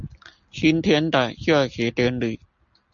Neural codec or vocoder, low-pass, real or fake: none; 7.2 kHz; real